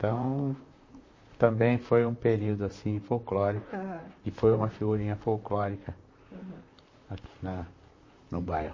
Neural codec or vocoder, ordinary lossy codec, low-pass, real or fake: vocoder, 44.1 kHz, 128 mel bands, Pupu-Vocoder; MP3, 32 kbps; 7.2 kHz; fake